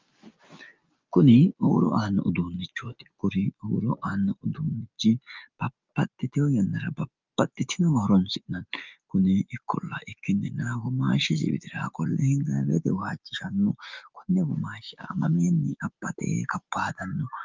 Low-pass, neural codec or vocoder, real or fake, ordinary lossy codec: 7.2 kHz; none; real; Opus, 24 kbps